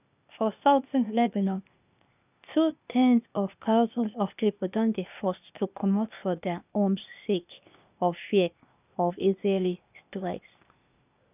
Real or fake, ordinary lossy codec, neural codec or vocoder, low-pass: fake; none; codec, 16 kHz, 0.8 kbps, ZipCodec; 3.6 kHz